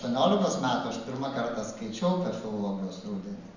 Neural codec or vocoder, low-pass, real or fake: none; 7.2 kHz; real